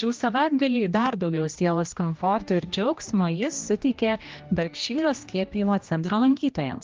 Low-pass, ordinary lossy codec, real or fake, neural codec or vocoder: 7.2 kHz; Opus, 24 kbps; fake; codec, 16 kHz, 1 kbps, X-Codec, HuBERT features, trained on general audio